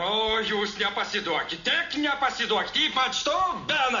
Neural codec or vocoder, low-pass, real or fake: none; 7.2 kHz; real